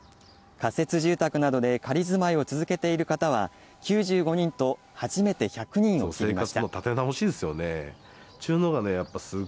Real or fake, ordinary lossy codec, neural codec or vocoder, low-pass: real; none; none; none